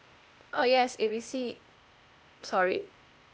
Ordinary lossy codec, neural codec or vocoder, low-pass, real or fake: none; codec, 16 kHz, 0.8 kbps, ZipCodec; none; fake